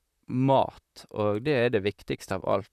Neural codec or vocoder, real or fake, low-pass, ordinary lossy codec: vocoder, 44.1 kHz, 128 mel bands, Pupu-Vocoder; fake; 14.4 kHz; none